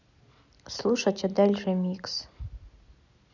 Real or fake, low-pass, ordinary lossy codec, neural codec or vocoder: real; 7.2 kHz; none; none